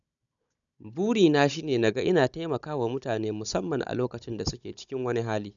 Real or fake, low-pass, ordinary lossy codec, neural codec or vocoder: fake; 7.2 kHz; none; codec, 16 kHz, 16 kbps, FunCodec, trained on Chinese and English, 50 frames a second